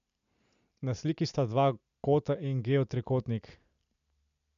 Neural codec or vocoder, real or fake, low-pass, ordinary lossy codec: none; real; 7.2 kHz; none